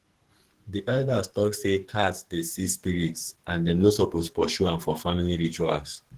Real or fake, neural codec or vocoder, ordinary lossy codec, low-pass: fake; codec, 44.1 kHz, 2.6 kbps, SNAC; Opus, 16 kbps; 14.4 kHz